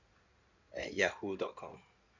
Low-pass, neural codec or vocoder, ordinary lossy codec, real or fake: 7.2 kHz; codec, 16 kHz in and 24 kHz out, 2.2 kbps, FireRedTTS-2 codec; none; fake